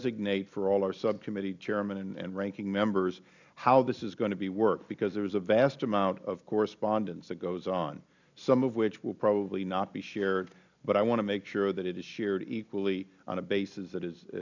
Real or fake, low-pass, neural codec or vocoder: real; 7.2 kHz; none